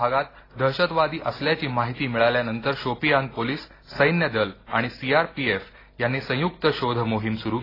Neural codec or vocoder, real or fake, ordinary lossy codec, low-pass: none; real; AAC, 24 kbps; 5.4 kHz